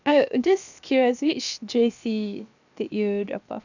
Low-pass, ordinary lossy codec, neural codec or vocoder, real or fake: 7.2 kHz; none; codec, 16 kHz, 0.7 kbps, FocalCodec; fake